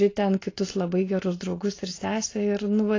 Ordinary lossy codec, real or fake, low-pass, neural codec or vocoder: AAC, 32 kbps; real; 7.2 kHz; none